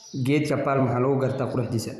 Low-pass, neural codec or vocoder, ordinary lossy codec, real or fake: 14.4 kHz; vocoder, 44.1 kHz, 128 mel bands every 256 samples, BigVGAN v2; none; fake